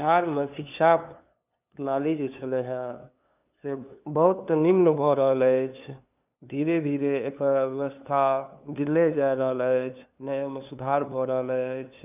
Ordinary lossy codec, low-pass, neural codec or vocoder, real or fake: none; 3.6 kHz; codec, 16 kHz, 2 kbps, FunCodec, trained on LibriTTS, 25 frames a second; fake